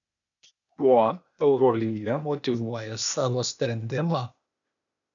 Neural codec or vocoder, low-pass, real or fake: codec, 16 kHz, 0.8 kbps, ZipCodec; 7.2 kHz; fake